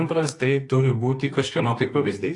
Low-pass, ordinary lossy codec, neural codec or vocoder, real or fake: 10.8 kHz; AAC, 48 kbps; codec, 24 kHz, 0.9 kbps, WavTokenizer, medium music audio release; fake